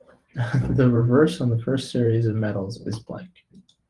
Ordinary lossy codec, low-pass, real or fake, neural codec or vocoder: Opus, 24 kbps; 10.8 kHz; fake; vocoder, 44.1 kHz, 128 mel bands every 512 samples, BigVGAN v2